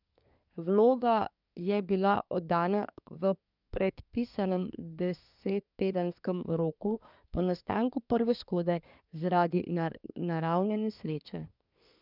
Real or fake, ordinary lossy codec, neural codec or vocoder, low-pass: fake; none; codec, 24 kHz, 1 kbps, SNAC; 5.4 kHz